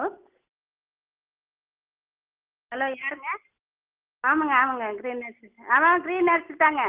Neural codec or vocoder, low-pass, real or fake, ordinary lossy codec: none; 3.6 kHz; real; Opus, 16 kbps